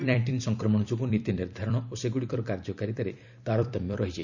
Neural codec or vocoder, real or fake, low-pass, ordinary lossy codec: vocoder, 44.1 kHz, 128 mel bands every 256 samples, BigVGAN v2; fake; 7.2 kHz; none